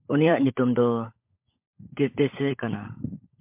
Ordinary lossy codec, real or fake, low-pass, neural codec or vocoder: MP3, 32 kbps; fake; 3.6 kHz; codec, 16 kHz, 16 kbps, FunCodec, trained on LibriTTS, 50 frames a second